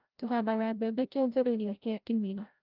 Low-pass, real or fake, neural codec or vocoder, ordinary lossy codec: 5.4 kHz; fake; codec, 16 kHz, 0.5 kbps, FreqCodec, larger model; Opus, 24 kbps